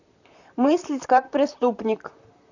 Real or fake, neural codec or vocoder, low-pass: fake; vocoder, 44.1 kHz, 128 mel bands, Pupu-Vocoder; 7.2 kHz